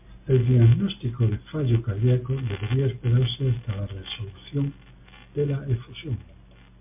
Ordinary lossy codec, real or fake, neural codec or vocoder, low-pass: MP3, 32 kbps; real; none; 3.6 kHz